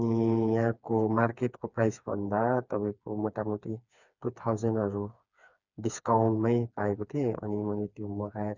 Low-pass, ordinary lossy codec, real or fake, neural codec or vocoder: 7.2 kHz; none; fake; codec, 16 kHz, 4 kbps, FreqCodec, smaller model